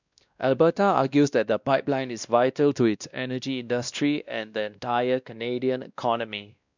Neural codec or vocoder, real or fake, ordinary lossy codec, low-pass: codec, 16 kHz, 1 kbps, X-Codec, WavLM features, trained on Multilingual LibriSpeech; fake; none; 7.2 kHz